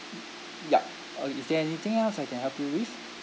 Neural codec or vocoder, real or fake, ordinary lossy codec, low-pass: none; real; none; none